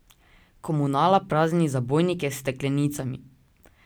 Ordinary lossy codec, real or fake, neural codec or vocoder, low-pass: none; real; none; none